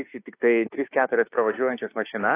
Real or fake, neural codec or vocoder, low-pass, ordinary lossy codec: fake; autoencoder, 48 kHz, 32 numbers a frame, DAC-VAE, trained on Japanese speech; 3.6 kHz; AAC, 24 kbps